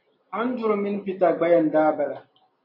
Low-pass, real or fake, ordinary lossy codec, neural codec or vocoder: 5.4 kHz; real; MP3, 32 kbps; none